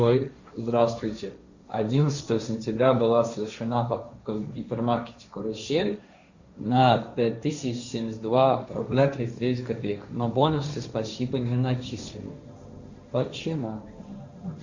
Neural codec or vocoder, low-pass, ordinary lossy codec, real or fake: codec, 16 kHz, 1.1 kbps, Voila-Tokenizer; 7.2 kHz; Opus, 64 kbps; fake